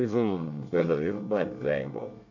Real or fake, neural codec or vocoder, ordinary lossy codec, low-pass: fake; codec, 24 kHz, 1 kbps, SNAC; none; 7.2 kHz